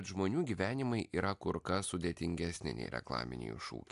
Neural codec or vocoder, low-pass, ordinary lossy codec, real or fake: none; 10.8 kHz; AAC, 64 kbps; real